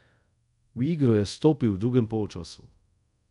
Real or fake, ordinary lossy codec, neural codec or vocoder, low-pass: fake; none; codec, 24 kHz, 0.5 kbps, DualCodec; 10.8 kHz